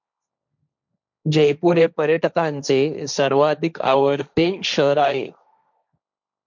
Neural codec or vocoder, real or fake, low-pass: codec, 16 kHz, 1.1 kbps, Voila-Tokenizer; fake; 7.2 kHz